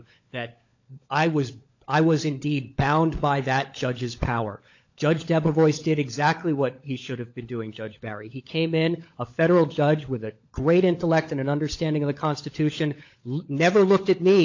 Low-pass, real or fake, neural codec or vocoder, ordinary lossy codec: 7.2 kHz; fake; codec, 16 kHz, 16 kbps, FunCodec, trained on LibriTTS, 50 frames a second; AAC, 48 kbps